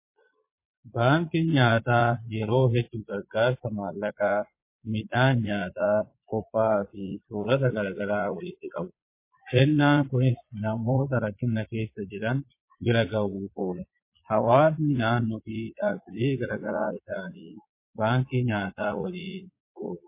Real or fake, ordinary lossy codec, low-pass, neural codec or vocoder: fake; MP3, 24 kbps; 3.6 kHz; vocoder, 22.05 kHz, 80 mel bands, Vocos